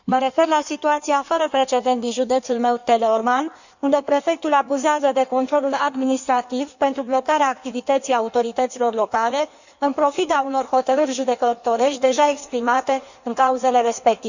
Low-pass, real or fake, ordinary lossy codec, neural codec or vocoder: 7.2 kHz; fake; none; codec, 16 kHz in and 24 kHz out, 1.1 kbps, FireRedTTS-2 codec